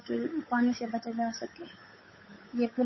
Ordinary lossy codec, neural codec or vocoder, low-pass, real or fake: MP3, 24 kbps; codec, 16 kHz, 8 kbps, FunCodec, trained on Chinese and English, 25 frames a second; 7.2 kHz; fake